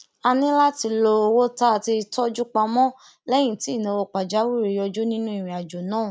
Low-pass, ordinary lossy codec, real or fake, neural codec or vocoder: none; none; real; none